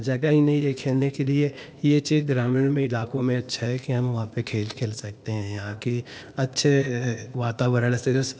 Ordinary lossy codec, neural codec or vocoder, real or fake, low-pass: none; codec, 16 kHz, 0.8 kbps, ZipCodec; fake; none